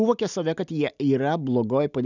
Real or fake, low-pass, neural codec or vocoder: real; 7.2 kHz; none